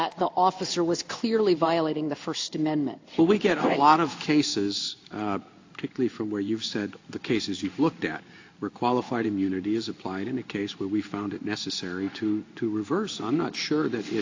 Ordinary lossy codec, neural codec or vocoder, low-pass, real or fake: AAC, 48 kbps; codec, 16 kHz in and 24 kHz out, 1 kbps, XY-Tokenizer; 7.2 kHz; fake